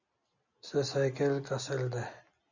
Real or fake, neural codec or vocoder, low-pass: real; none; 7.2 kHz